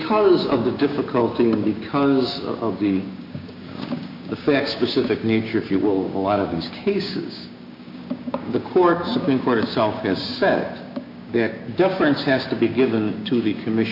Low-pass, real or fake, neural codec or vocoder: 5.4 kHz; fake; codec, 16 kHz, 6 kbps, DAC